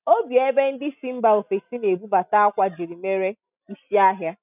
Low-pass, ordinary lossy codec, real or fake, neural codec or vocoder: 3.6 kHz; none; real; none